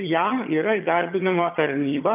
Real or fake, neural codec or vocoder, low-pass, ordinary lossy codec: fake; vocoder, 22.05 kHz, 80 mel bands, HiFi-GAN; 3.6 kHz; AAC, 24 kbps